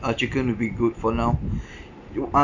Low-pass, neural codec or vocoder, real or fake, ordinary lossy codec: 7.2 kHz; none; real; none